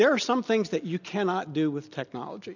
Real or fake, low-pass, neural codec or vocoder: real; 7.2 kHz; none